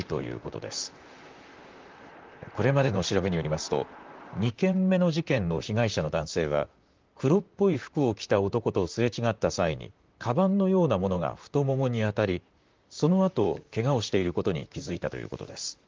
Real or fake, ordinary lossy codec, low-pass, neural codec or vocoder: fake; Opus, 32 kbps; 7.2 kHz; vocoder, 44.1 kHz, 128 mel bands, Pupu-Vocoder